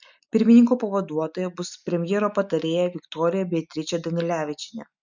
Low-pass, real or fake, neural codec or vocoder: 7.2 kHz; real; none